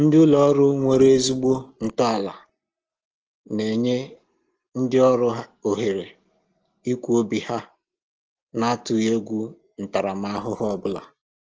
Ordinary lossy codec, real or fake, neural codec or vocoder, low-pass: Opus, 16 kbps; real; none; 7.2 kHz